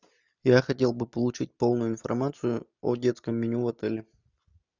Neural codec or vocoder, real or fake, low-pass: none; real; 7.2 kHz